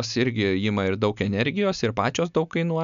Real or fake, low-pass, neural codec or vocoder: real; 7.2 kHz; none